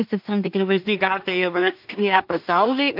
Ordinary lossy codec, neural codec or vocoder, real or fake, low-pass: AAC, 48 kbps; codec, 16 kHz in and 24 kHz out, 0.4 kbps, LongCat-Audio-Codec, two codebook decoder; fake; 5.4 kHz